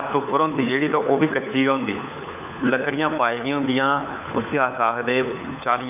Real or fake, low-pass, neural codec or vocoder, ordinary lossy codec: fake; 3.6 kHz; codec, 16 kHz, 4 kbps, FunCodec, trained on LibriTTS, 50 frames a second; none